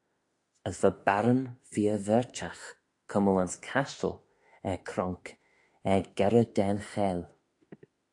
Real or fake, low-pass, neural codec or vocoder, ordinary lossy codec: fake; 10.8 kHz; autoencoder, 48 kHz, 32 numbers a frame, DAC-VAE, trained on Japanese speech; AAC, 48 kbps